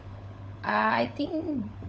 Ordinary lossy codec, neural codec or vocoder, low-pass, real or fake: none; codec, 16 kHz, 16 kbps, FunCodec, trained on LibriTTS, 50 frames a second; none; fake